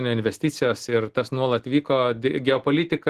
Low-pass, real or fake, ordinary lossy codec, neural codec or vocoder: 14.4 kHz; real; Opus, 16 kbps; none